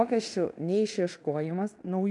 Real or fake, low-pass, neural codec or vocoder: fake; 10.8 kHz; codec, 16 kHz in and 24 kHz out, 0.9 kbps, LongCat-Audio-Codec, fine tuned four codebook decoder